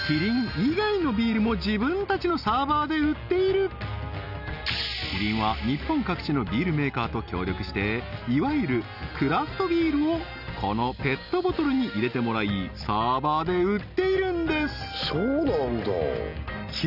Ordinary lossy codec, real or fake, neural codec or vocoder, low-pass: none; real; none; 5.4 kHz